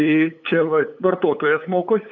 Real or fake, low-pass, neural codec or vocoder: fake; 7.2 kHz; codec, 16 kHz, 8 kbps, FunCodec, trained on LibriTTS, 25 frames a second